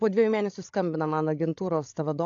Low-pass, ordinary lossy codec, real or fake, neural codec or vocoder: 7.2 kHz; AAC, 48 kbps; fake; codec, 16 kHz, 16 kbps, FreqCodec, larger model